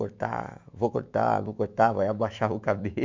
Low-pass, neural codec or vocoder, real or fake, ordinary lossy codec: 7.2 kHz; autoencoder, 48 kHz, 128 numbers a frame, DAC-VAE, trained on Japanese speech; fake; MP3, 64 kbps